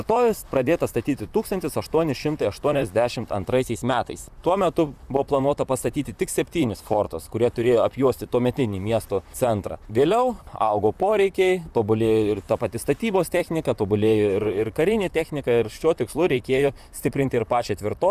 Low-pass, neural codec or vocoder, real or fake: 14.4 kHz; vocoder, 44.1 kHz, 128 mel bands, Pupu-Vocoder; fake